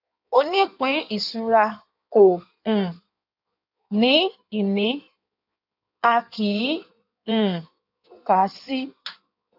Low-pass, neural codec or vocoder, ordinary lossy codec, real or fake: 5.4 kHz; codec, 16 kHz in and 24 kHz out, 1.1 kbps, FireRedTTS-2 codec; none; fake